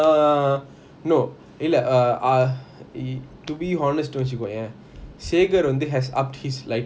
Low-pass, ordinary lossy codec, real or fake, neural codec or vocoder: none; none; real; none